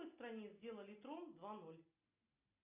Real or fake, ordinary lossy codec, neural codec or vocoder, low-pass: real; AAC, 32 kbps; none; 3.6 kHz